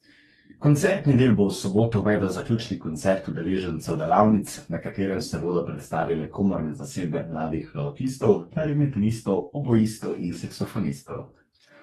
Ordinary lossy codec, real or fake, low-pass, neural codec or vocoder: AAC, 32 kbps; fake; 19.8 kHz; codec, 44.1 kHz, 2.6 kbps, DAC